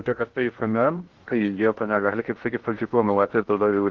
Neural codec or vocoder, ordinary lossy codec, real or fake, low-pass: codec, 16 kHz in and 24 kHz out, 0.6 kbps, FocalCodec, streaming, 2048 codes; Opus, 16 kbps; fake; 7.2 kHz